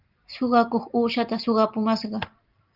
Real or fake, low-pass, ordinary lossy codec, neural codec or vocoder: real; 5.4 kHz; Opus, 24 kbps; none